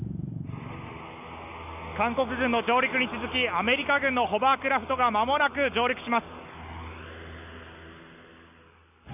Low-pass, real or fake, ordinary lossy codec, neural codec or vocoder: 3.6 kHz; real; none; none